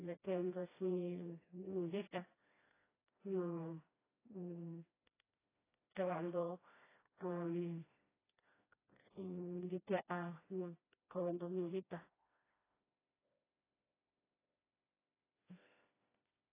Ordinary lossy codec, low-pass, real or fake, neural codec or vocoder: AAC, 16 kbps; 3.6 kHz; fake; codec, 16 kHz, 1 kbps, FreqCodec, smaller model